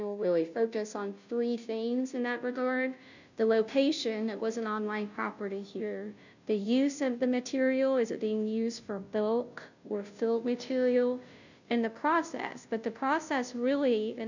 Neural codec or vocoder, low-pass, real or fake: codec, 16 kHz, 0.5 kbps, FunCodec, trained on Chinese and English, 25 frames a second; 7.2 kHz; fake